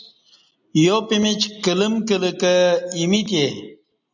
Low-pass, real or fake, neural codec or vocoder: 7.2 kHz; real; none